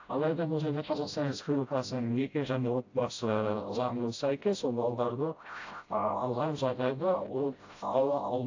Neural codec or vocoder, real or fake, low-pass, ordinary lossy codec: codec, 16 kHz, 0.5 kbps, FreqCodec, smaller model; fake; 7.2 kHz; AAC, 48 kbps